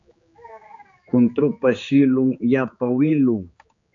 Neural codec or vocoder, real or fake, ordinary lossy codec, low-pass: codec, 16 kHz, 4 kbps, X-Codec, HuBERT features, trained on general audio; fake; AAC, 64 kbps; 7.2 kHz